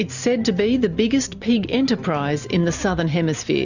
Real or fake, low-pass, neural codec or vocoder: real; 7.2 kHz; none